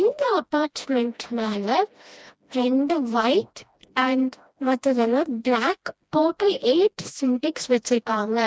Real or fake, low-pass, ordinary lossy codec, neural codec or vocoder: fake; none; none; codec, 16 kHz, 1 kbps, FreqCodec, smaller model